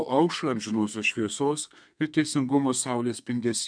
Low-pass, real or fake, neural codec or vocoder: 9.9 kHz; fake; codec, 32 kHz, 1.9 kbps, SNAC